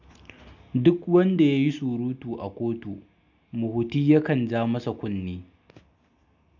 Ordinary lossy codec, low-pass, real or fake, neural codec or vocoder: none; 7.2 kHz; real; none